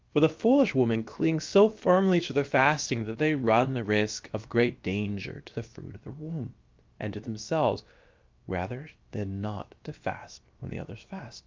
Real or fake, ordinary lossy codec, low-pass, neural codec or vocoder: fake; Opus, 24 kbps; 7.2 kHz; codec, 16 kHz, about 1 kbps, DyCAST, with the encoder's durations